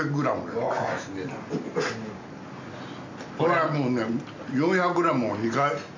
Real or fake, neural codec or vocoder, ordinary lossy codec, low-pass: real; none; MP3, 48 kbps; 7.2 kHz